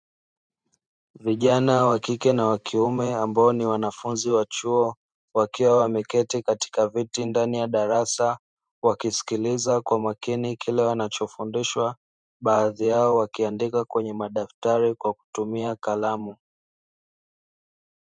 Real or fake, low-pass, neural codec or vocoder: fake; 9.9 kHz; vocoder, 44.1 kHz, 128 mel bands every 512 samples, BigVGAN v2